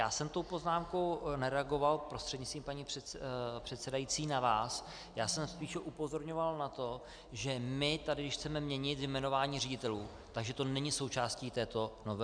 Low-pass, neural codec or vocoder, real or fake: 9.9 kHz; none; real